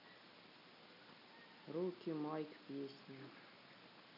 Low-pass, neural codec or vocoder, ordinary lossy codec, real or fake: 5.4 kHz; none; none; real